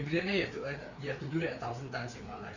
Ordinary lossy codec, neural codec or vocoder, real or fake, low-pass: none; codec, 16 kHz, 4 kbps, FreqCodec, larger model; fake; 7.2 kHz